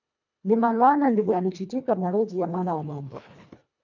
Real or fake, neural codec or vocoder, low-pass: fake; codec, 24 kHz, 1.5 kbps, HILCodec; 7.2 kHz